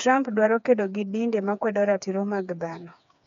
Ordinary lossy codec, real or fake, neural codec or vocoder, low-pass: none; fake; codec, 16 kHz, 4 kbps, FreqCodec, smaller model; 7.2 kHz